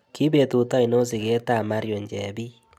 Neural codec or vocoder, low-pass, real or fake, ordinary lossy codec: none; 19.8 kHz; real; none